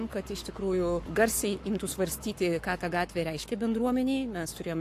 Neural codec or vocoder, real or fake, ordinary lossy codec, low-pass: codec, 44.1 kHz, 7.8 kbps, Pupu-Codec; fake; AAC, 64 kbps; 14.4 kHz